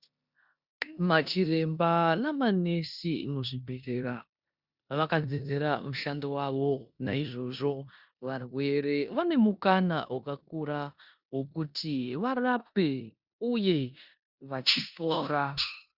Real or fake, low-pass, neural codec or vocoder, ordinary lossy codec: fake; 5.4 kHz; codec, 16 kHz in and 24 kHz out, 0.9 kbps, LongCat-Audio-Codec, four codebook decoder; Opus, 64 kbps